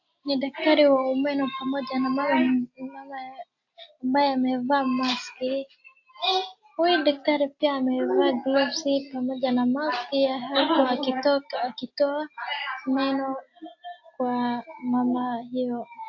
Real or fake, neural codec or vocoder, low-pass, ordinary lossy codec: real; none; 7.2 kHz; AAC, 48 kbps